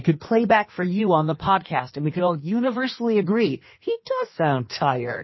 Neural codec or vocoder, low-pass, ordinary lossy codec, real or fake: codec, 16 kHz in and 24 kHz out, 1.1 kbps, FireRedTTS-2 codec; 7.2 kHz; MP3, 24 kbps; fake